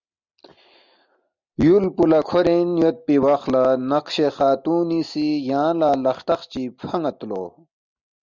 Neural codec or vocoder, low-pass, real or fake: none; 7.2 kHz; real